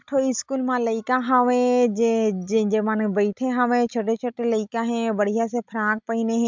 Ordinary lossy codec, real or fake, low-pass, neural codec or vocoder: MP3, 64 kbps; real; 7.2 kHz; none